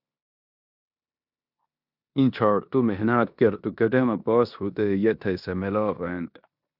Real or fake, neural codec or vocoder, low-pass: fake; codec, 16 kHz in and 24 kHz out, 0.9 kbps, LongCat-Audio-Codec, four codebook decoder; 5.4 kHz